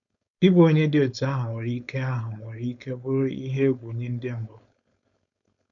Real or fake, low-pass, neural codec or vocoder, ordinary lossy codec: fake; 7.2 kHz; codec, 16 kHz, 4.8 kbps, FACodec; none